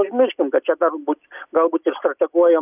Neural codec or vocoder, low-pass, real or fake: none; 3.6 kHz; real